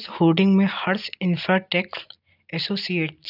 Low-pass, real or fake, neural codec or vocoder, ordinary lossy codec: 5.4 kHz; real; none; none